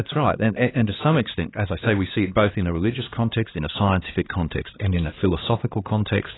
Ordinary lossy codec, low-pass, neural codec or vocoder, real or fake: AAC, 16 kbps; 7.2 kHz; codec, 16 kHz, 2 kbps, X-Codec, HuBERT features, trained on LibriSpeech; fake